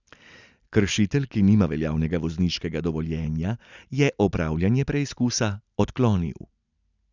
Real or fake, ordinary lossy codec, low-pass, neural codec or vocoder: real; none; 7.2 kHz; none